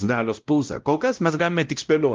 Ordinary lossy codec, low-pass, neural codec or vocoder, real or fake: Opus, 32 kbps; 7.2 kHz; codec, 16 kHz, 0.5 kbps, X-Codec, WavLM features, trained on Multilingual LibriSpeech; fake